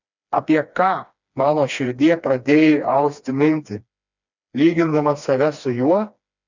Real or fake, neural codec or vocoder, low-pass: fake; codec, 16 kHz, 2 kbps, FreqCodec, smaller model; 7.2 kHz